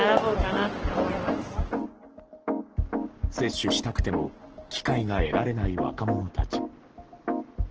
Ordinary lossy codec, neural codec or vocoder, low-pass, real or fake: Opus, 16 kbps; codec, 44.1 kHz, 7.8 kbps, Pupu-Codec; 7.2 kHz; fake